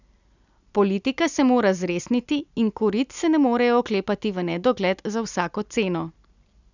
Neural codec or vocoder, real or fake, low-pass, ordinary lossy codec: none; real; 7.2 kHz; none